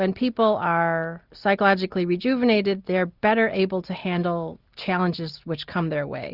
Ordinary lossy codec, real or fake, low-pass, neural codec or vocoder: Opus, 64 kbps; real; 5.4 kHz; none